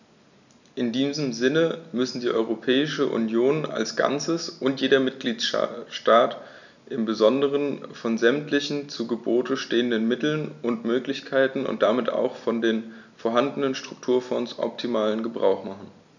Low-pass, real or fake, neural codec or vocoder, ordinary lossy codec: 7.2 kHz; real; none; none